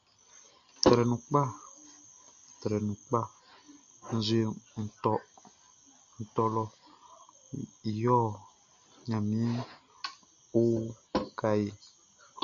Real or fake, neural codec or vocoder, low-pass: real; none; 7.2 kHz